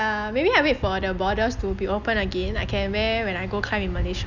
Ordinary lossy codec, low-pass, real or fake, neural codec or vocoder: none; 7.2 kHz; real; none